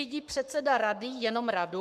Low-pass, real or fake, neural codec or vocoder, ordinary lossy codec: 14.4 kHz; fake; codec, 44.1 kHz, 7.8 kbps, Pupu-Codec; MP3, 96 kbps